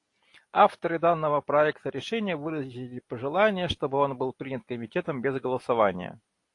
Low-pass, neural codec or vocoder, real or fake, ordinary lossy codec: 10.8 kHz; none; real; AAC, 48 kbps